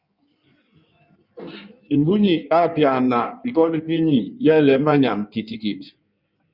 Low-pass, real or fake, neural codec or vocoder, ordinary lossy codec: 5.4 kHz; fake; codec, 16 kHz in and 24 kHz out, 1.1 kbps, FireRedTTS-2 codec; Opus, 64 kbps